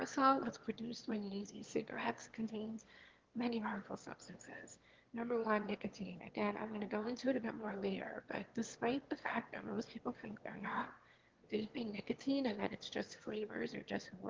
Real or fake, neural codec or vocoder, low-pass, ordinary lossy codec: fake; autoencoder, 22.05 kHz, a latent of 192 numbers a frame, VITS, trained on one speaker; 7.2 kHz; Opus, 16 kbps